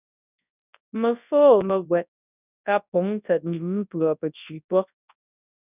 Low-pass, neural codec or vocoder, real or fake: 3.6 kHz; codec, 24 kHz, 0.9 kbps, WavTokenizer, large speech release; fake